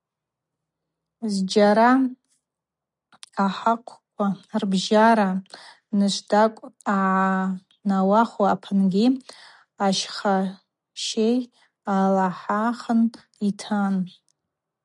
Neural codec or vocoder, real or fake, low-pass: none; real; 10.8 kHz